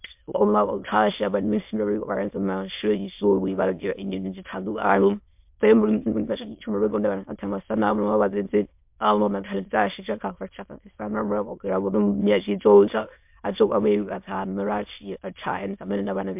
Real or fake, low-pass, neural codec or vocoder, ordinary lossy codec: fake; 3.6 kHz; autoencoder, 22.05 kHz, a latent of 192 numbers a frame, VITS, trained on many speakers; MP3, 32 kbps